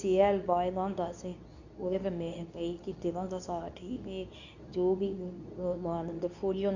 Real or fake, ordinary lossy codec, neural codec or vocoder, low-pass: fake; none; codec, 24 kHz, 0.9 kbps, WavTokenizer, medium speech release version 2; 7.2 kHz